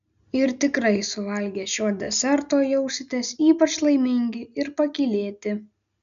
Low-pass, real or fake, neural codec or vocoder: 7.2 kHz; real; none